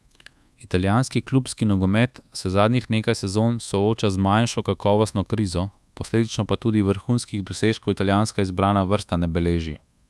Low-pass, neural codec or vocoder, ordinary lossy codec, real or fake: none; codec, 24 kHz, 1.2 kbps, DualCodec; none; fake